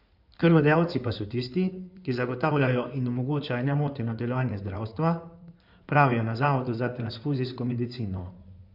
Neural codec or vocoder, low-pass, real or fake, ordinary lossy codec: codec, 16 kHz in and 24 kHz out, 2.2 kbps, FireRedTTS-2 codec; 5.4 kHz; fake; none